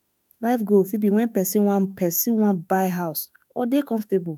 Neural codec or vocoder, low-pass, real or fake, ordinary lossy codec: autoencoder, 48 kHz, 32 numbers a frame, DAC-VAE, trained on Japanese speech; none; fake; none